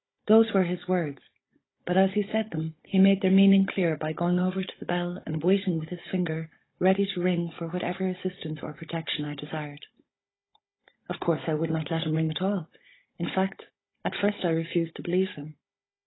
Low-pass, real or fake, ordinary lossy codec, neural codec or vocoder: 7.2 kHz; fake; AAC, 16 kbps; codec, 16 kHz, 16 kbps, FunCodec, trained on Chinese and English, 50 frames a second